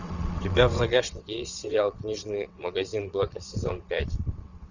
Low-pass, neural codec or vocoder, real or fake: 7.2 kHz; vocoder, 22.05 kHz, 80 mel bands, WaveNeXt; fake